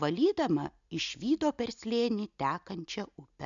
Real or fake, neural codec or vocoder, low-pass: real; none; 7.2 kHz